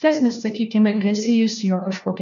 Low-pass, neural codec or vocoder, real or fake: 7.2 kHz; codec, 16 kHz, 1 kbps, FunCodec, trained on LibriTTS, 50 frames a second; fake